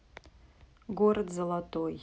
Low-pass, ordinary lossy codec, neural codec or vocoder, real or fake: none; none; none; real